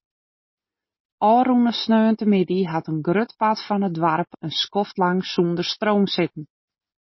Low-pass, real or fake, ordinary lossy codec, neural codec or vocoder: 7.2 kHz; real; MP3, 24 kbps; none